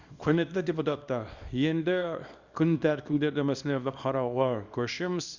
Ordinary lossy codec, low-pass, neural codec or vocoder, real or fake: Opus, 64 kbps; 7.2 kHz; codec, 24 kHz, 0.9 kbps, WavTokenizer, small release; fake